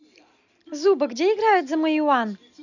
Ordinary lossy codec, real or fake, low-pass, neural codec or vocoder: none; real; 7.2 kHz; none